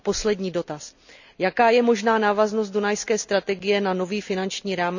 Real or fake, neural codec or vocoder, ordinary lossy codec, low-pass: real; none; none; 7.2 kHz